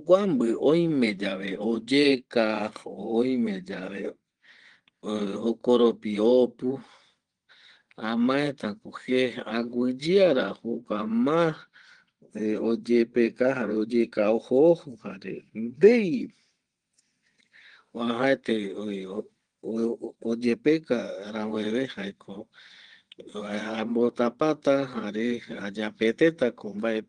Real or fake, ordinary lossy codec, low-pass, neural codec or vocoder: fake; Opus, 24 kbps; 9.9 kHz; vocoder, 22.05 kHz, 80 mel bands, WaveNeXt